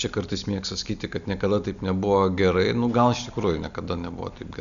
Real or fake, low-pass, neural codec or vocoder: real; 7.2 kHz; none